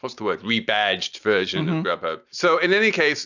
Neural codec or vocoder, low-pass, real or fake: none; 7.2 kHz; real